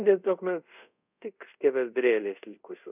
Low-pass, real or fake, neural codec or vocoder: 3.6 kHz; fake; codec, 24 kHz, 0.5 kbps, DualCodec